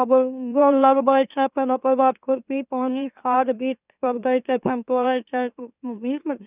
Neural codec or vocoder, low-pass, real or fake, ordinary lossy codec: autoencoder, 44.1 kHz, a latent of 192 numbers a frame, MeloTTS; 3.6 kHz; fake; none